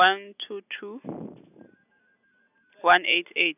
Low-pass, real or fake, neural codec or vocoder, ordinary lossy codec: 3.6 kHz; real; none; none